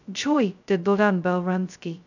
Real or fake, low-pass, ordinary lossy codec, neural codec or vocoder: fake; 7.2 kHz; none; codec, 16 kHz, 0.2 kbps, FocalCodec